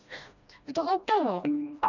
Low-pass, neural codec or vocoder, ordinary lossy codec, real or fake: 7.2 kHz; codec, 16 kHz, 1 kbps, FreqCodec, smaller model; none; fake